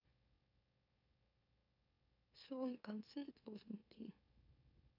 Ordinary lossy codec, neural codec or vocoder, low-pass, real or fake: none; autoencoder, 44.1 kHz, a latent of 192 numbers a frame, MeloTTS; 5.4 kHz; fake